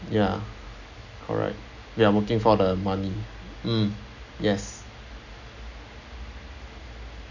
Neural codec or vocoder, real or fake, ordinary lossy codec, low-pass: none; real; none; 7.2 kHz